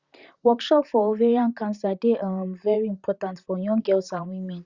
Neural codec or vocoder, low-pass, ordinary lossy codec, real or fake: vocoder, 44.1 kHz, 128 mel bands, Pupu-Vocoder; 7.2 kHz; Opus, 64 kbps; fake